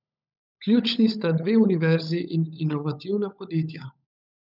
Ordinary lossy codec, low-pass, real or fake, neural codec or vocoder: none; 5.4 kHz; fake; codec, 16 kHz, 16 kbps, FunCodec, trained on LibriTTS, 50 frames a second